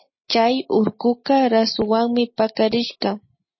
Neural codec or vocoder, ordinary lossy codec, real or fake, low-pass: none; MP3, 24 kbps; real; 7.2 kHz